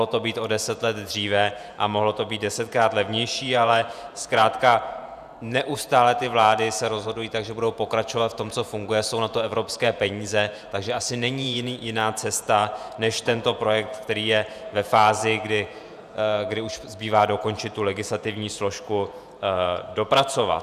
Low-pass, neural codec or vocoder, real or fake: 14.4 kHz; vocoder, 48 kHz, 128 mel bands, Vocos; fake